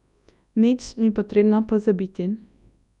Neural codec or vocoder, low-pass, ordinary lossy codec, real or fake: codec, 24 kHz, 0.9 kbps, WavTokenizer, large speech release; 10.8 kHz; none; fake